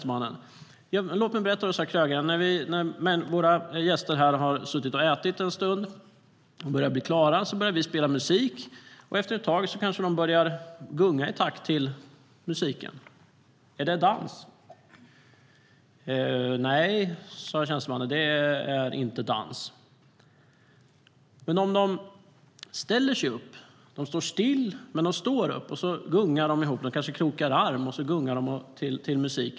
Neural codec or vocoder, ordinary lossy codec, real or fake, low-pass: none; none; real; none